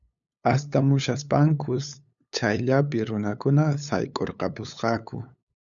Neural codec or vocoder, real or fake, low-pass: codec, 16 kHz, 8 kbps, FunCodec, trained on LibriTTS, 25 frames a second; fake; 7.2 kHz